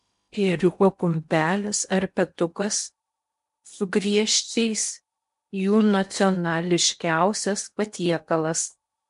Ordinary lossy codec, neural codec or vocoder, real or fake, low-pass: MP3, 64 kbps; codec, 16 kHz in and 24 kHz out, 0.8 kbps, FocalCodec, streaming, 65536 codes; fake; 10.8 kHz